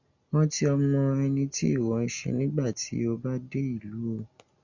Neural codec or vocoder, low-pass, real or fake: none; 7.2 kHz; real